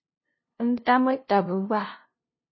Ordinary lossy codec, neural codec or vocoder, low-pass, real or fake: MP3, 24 kbps; codec, 16 kHz, 0.5 kbps, FunCodec, trained on LibriTTS, 25 frames a second; 7.2 kHz; fake